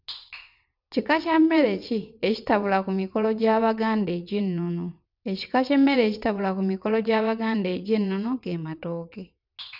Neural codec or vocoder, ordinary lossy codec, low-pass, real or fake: vocoder, 22.05 kHz, 80 mel bands, WaveNeXt; none; 5.4 kHz; fake